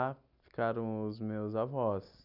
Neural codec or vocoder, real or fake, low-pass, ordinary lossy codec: none; real; 5.4 kHz; none